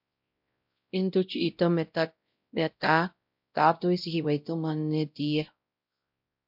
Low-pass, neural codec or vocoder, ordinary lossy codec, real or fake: 5.4 kHz; codec, 16 kHz, 0.5 kbps, X-Codec, WavLM features, trained on Multilingual LibriSpeech; MP3, 48 kbps; fake